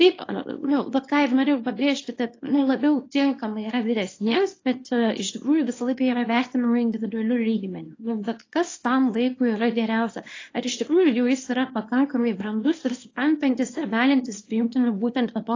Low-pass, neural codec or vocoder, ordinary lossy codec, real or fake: 7.2 kHz; codec, 24 kHz, 0.9 kbps, WavTokenizer, small release; AAC, 32 kbps; fake